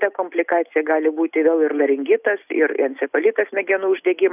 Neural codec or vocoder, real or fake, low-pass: none; real; 3.6 kHz